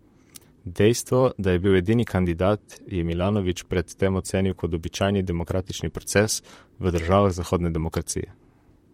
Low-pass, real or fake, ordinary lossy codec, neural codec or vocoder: 19.8 kHz; fake; MP3, 64 kbps; vocoder, 44.1 kHz, 128 mel bands, Pupu-Vocoder